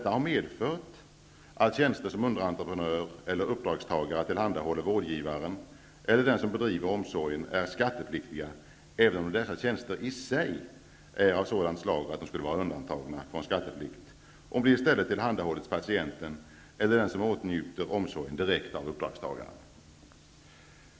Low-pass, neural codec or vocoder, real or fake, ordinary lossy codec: none; none; real; none